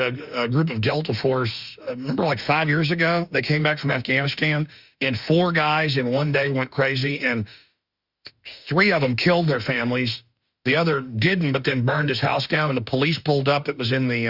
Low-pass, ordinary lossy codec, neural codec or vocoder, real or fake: 5.4 kHz; Opus, 64 kbps; autoencoder, 48 kHz, 32 numbers a frame, DAC-VAE, trained on Japanese speech; fake